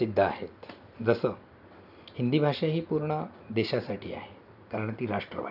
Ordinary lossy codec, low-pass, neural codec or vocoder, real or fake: none; 5.4 kHz; none; real